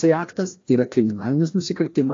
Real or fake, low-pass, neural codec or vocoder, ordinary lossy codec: fake; 7.2 kHz; codec, 16 kHz, 1 kbps, FreqCodec, larger model; AAC, 48 kbps